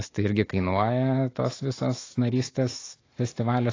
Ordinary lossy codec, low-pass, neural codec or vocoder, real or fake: AAC, 32 kbps; 7.2 kHz; autoencoder, 48 kHz, 128 numbers a frame, DAC-VAE, trained on Japanese speech; fake